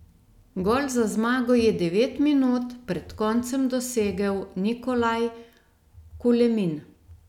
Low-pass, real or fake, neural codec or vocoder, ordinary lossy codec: 19.8 kHz; real; none; none